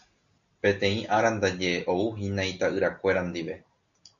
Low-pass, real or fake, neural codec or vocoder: 7.2 kHz; real; none